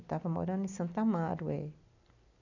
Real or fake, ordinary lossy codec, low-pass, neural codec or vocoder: real; none; 7.2 kHz; none